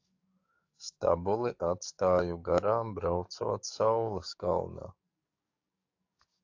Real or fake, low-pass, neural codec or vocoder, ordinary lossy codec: fake; 7.2 kHz; codec, 44.1 kHz, 7.8 kbps, DAC; AAC, 48 kbps